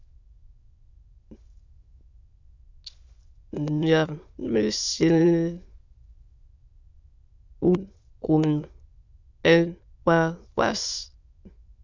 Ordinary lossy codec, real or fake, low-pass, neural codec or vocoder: Opus, 64 kbps; fake; 7.2 kHz; autoencoder, 22.05 kHz, a latent of 192 numbers a frame, VITS, trained on many speakers